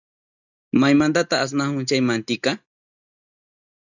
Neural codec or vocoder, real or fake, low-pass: none; real; 7.2 kHz